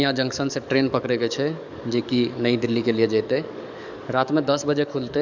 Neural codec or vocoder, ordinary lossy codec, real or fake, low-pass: codec, 44.1 kHz, 7.8 kbps, DAC; none; fake; 7.2 kHz